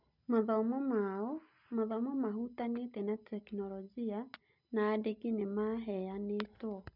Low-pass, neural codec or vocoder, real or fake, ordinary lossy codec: 5.4 kHz; none; real; none